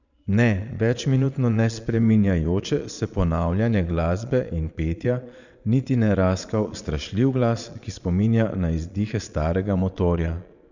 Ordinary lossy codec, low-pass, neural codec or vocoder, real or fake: none; 7.2 kHz; vocoder, 22.05 kHz, 80 mel bands, Vocos; fake